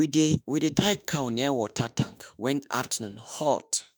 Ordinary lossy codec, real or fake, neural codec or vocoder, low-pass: none; fake; autoencoder, 48 kHz, 32 numbers a frame, DAC-VAE, trained on Japanese speech; none